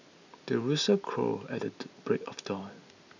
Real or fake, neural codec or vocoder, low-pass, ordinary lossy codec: real; none; 7.2 kHz; none